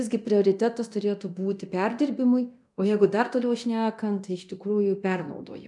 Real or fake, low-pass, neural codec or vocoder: fake; 10.8 kHz; codec, 24 kHz, 0.9 kbps, DualCodec